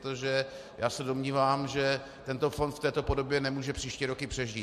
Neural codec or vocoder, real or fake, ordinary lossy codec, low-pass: none; real; MP3, 64 kbps; 14.4 kHz